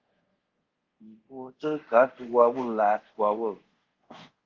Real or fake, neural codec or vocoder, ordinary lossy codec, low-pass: fake; codec, 24 kHz, 0.5 kbps, DualCodec; Opus, 16 kbps; 7.2 kHz